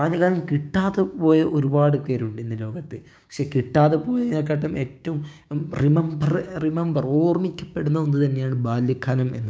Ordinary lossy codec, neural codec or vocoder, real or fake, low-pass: none; codec, 16 kHz, 6 kbps, DAC; fake; none